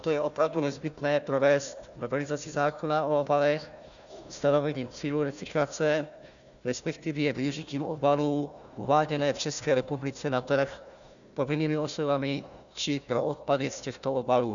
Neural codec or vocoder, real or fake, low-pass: codec, 16 kHz, 1 kbps, FunCodec, trained on Chinese and English, 50 frames a second; fake; 7.2 kHz